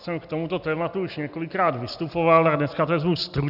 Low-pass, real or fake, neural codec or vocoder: 5.4 kHz; real; none